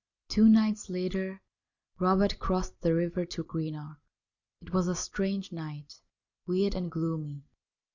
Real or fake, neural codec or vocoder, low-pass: real; none; 7.2 kHz